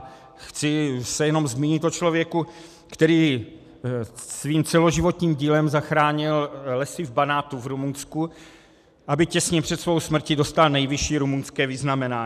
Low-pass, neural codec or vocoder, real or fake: 14.4 kHz; none; real